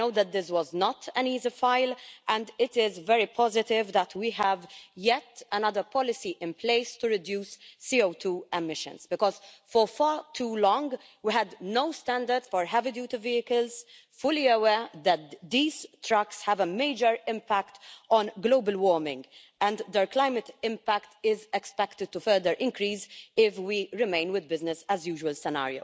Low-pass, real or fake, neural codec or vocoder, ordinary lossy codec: none; real; none; none